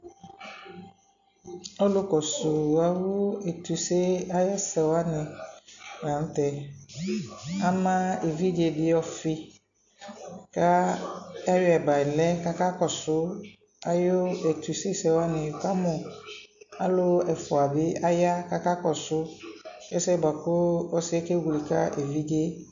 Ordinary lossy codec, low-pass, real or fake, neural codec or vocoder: AAC, 64 kbps; 7.2 kHz; real; none